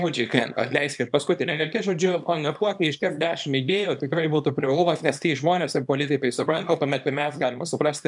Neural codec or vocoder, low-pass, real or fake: codec, 24 kHz, 0.9 kbps, WavTokenizer, small release; 10.8 kHz; fake